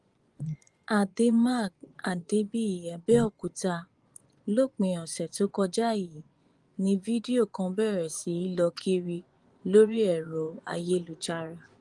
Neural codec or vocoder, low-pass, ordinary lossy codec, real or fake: vocoder, 24 kHz, 100 mel bands, Vocos; 10.8 kHz; Opus, 24 kbps; fake